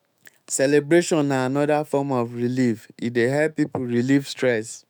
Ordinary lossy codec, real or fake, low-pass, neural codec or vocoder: none; fake; none; autoencoder, 48 kHz, 128 numbers a frame, DAC-VAE, trained on Japanese speech